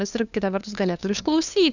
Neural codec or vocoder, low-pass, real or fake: codec, 16 kHz, 2 kbps, FunCodec, trained on LibriTTS, 25 frames a second; 7.2 kHz; fake